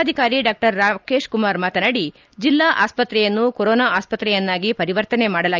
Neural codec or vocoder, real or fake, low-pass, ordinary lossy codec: none; real; 7.2 kHz; Opus, 24 kbps